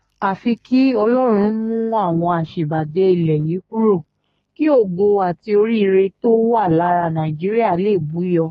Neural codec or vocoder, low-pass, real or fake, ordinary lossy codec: codec, 32 kHz, 1.9 kbps, SNAC; 14.4 kHz; fake; AAC, 32 kbps